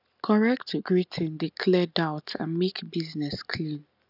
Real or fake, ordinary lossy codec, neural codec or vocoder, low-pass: real; none; none; 5.4 kHz